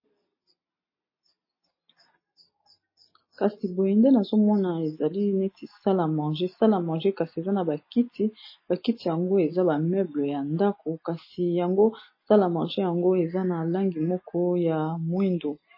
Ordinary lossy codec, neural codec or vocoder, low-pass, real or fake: MP3, 24 kbps; none; 5.4 kHz; real